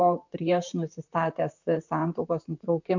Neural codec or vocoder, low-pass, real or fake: vocoder, 44.1 kHz, 128 mel bands, Pupu-Vocoder; 7.2 kHz; fake